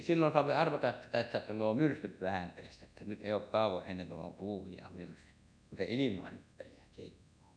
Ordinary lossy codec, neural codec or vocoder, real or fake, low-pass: none; codec, 24 kHz, 0.9 kbps, WavTokenizer, large speech release; fake; 9.9 kHz